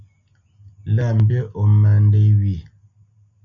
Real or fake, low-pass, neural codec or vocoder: real; 7.2 kHz; none